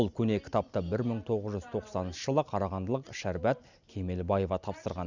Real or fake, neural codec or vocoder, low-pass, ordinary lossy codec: real; none; 7.2 kHz; none